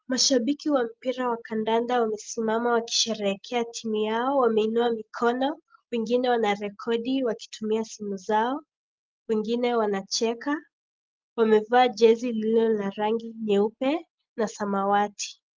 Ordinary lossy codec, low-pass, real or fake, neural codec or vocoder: Opus, 32 kbps; 7.2 kHz; real; none